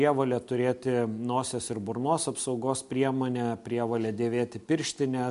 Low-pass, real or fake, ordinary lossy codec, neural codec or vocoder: 10.8 kHz; real; MP3, 64 kbps; none